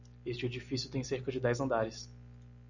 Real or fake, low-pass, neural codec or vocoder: real; 7.2 kHz; none